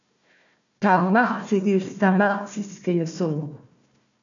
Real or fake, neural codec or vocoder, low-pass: fake; codec, 16 kHz, 1 kbps, FunCodec, trained on Chinese and English, 50 frames a second; 7.2 kHz